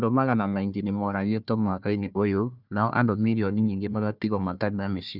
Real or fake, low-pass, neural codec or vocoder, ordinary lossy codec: fake; 5.4 kHz; codec, 16 kHz, 1 kbps, FunCodec, trained on Chinese and English, 50 frames a second; none